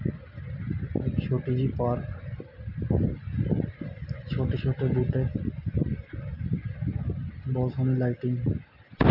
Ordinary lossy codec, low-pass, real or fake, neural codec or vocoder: none; 5.4 kHz; real; none